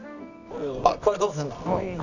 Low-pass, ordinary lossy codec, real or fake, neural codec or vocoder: 7.2 kHz; none; fake; codec, 24 kHz, 0.9 kbps, WavTokenizer, medium music audio release